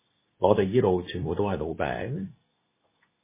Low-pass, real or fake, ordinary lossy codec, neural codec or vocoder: 3.6 kHz; fake; MP3, 16 kbps; codec, 24 kHz, 0.9 kbps, WavTokenizer, medium speech release version 2